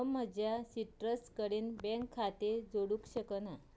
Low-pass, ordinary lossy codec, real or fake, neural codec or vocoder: none; none; real; none